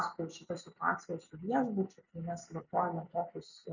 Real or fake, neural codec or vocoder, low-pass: real; none; 7.2 kHz